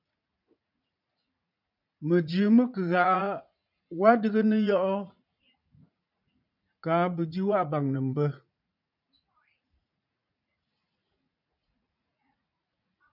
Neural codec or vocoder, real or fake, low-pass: vocoder, 44.1 kHz, 80 mel bands, Vocos; fake; 5.4 kHz